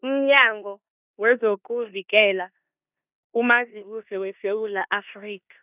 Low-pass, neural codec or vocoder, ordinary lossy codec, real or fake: 3.6 kHz; codec, 16 kHz in and 24 kHz out, 0.9 kbps, LongCat-Audio-Codec, four codebook decoder; none; fake